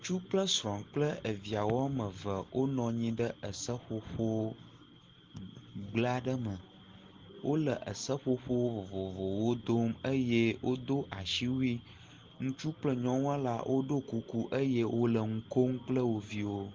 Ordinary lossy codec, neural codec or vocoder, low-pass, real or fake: Opus, 16 kbps; none; 7.2 kHz; real